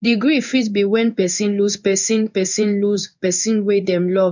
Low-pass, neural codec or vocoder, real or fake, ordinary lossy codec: 7.2 kHz; codec, 16 kHz in and 24 kHz out, 1 kbps, XY-Tokenizer; fake; none